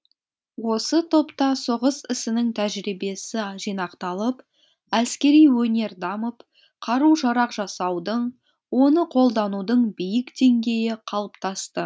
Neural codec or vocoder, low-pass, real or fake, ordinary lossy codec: none; none; real; none